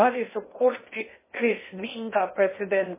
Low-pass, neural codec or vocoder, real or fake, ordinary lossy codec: 3.6 kHz; codec, 16 kHz in and 24 kHz out, 0.6 kbps, FocalCodec, streaming, 2048 codes; fake; MP3, 16 kbps